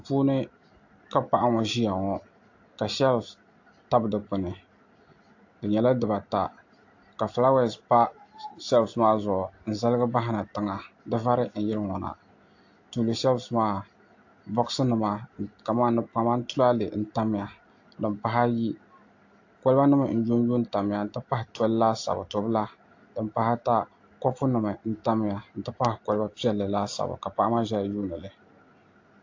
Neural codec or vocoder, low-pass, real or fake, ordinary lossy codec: none; 7.2 kHz; real; AAC, 48 kbps